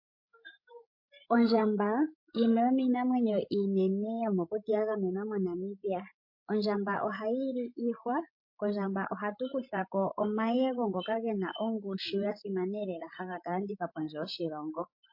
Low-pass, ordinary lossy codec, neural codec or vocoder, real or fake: 5.4 kHz; MP3, 32 kbps; codec, 16 kHz, 16 kbps, FreqCodec, larger model; fake